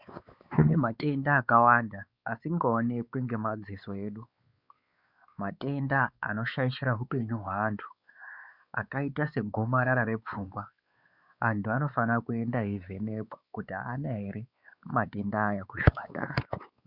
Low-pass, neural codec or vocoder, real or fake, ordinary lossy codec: 5.4 kHz; codec, 16 kHz, 4 kbps, X-Codec, WavLM features, trained on Multilingual LibriSpeech; fake; Opus, 64 kbps